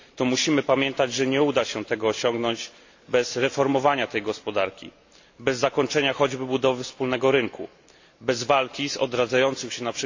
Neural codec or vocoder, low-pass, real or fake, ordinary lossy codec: none; 7.2 kHz; real; AAC, 48 kbps